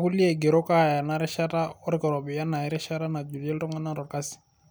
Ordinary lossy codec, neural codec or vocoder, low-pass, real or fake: none; none; none; real